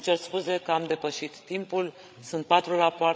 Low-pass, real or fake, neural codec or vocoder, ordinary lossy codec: none; fake; codec, 16 kHz, 16 kbps, FreqCodec, larger model; none